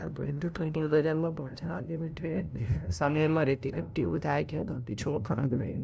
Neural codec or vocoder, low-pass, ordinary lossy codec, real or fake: codec, 16 kHz, 0.5 kbps, FunCodec, trained on LibriTTS, 25 frames a second; none; none; fake